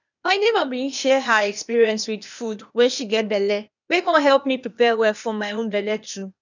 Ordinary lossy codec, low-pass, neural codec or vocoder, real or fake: none; 7.2 kHz; codec, 16 kHz, 0.8 kbps, ZipCodec; fake